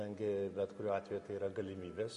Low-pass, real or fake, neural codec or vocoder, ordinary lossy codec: 14.4 kHz; real; none; MP3, 48 kbps